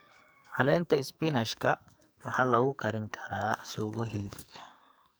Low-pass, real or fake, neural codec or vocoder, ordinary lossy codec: none; fake; codec, 44.1 kHz, 2.6 kbps, SNAC; none